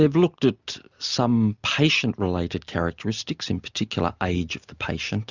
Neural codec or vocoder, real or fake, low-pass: none; real; 7.2 kHz